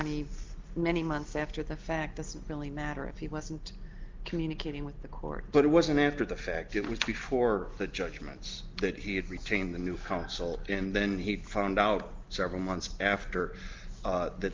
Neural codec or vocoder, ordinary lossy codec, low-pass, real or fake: none; Opus, 32 kbps; 7.2 kHz; real